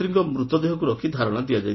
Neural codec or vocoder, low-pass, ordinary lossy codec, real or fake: none; 7.2 kHz; MP3, 24 kbps; real